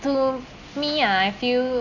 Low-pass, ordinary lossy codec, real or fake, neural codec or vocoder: 7.2 kHz; none; real; none